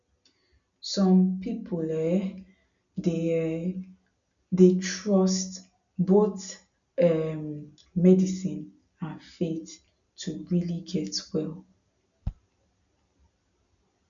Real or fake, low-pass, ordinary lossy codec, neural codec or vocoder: real; 7.2 kHz; none; none